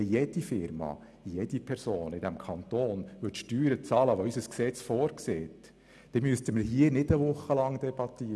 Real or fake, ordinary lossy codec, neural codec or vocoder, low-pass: real; none; none; none